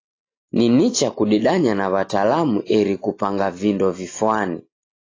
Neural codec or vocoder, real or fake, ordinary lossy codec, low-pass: none; real; AAC, 32 kbps; 7.2 kHz